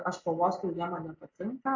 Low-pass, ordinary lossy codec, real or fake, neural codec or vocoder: 7.2 kHz; AAC, 48 kbps; real; none